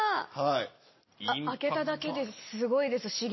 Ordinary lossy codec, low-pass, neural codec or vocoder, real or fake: MP3, 24 kbps; 7.2 kHz; none; real